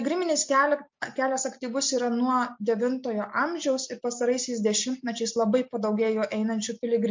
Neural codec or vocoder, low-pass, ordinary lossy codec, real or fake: none; 7.2 kHz; MP3, 48 kbps; real